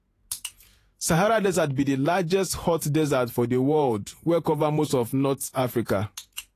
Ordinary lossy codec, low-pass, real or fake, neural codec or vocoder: AAC, 48 kbps; 14.4 kHz; fake; vocoder, 48 kHz, 128 mel bands, Vocos